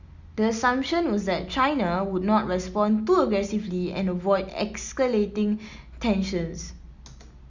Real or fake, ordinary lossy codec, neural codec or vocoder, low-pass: real; Opus, 64 kbps; none; 7.2 kHz